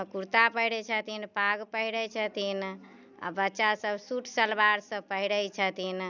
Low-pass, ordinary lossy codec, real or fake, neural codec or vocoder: 7.2 kHz; none; real; none